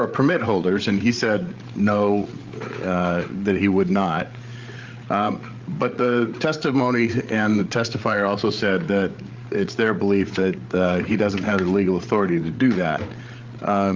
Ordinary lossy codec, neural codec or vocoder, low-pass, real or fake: Opus, 32 kbps; codec, 16 kHz, 8 kbps, FreqCodec, larger model; 7.2 kHz; fake